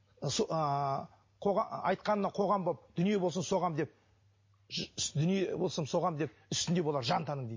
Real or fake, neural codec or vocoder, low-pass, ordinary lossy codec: real; none; 7.2 kHz; MP3, 32 kbps